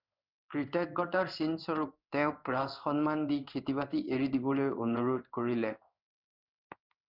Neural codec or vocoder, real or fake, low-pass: codec, 16 kHz in and 24 kHz out, 1 kbps, XY-Tokenizer; fake; 5.4 kHz